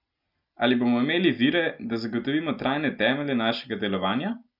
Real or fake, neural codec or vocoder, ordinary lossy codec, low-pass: real; none; none; 5.4 kHz